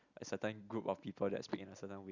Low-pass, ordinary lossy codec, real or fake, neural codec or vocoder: 7.2 kHz; Opus, 64 kbps; real; none